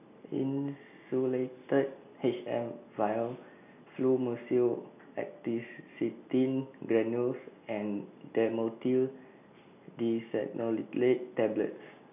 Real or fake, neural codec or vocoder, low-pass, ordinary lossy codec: real; none; 3.6 kHz; none